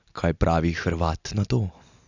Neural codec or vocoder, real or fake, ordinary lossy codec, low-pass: none; real; none; 7.2 kHz